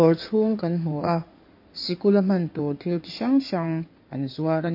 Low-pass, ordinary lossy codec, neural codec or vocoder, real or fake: 5.4 kHz; MP3, 32 kbps; codec, 16 kHz in and 24 kHz out, 2.2 kbps, FireRedTTS-2 codec; fake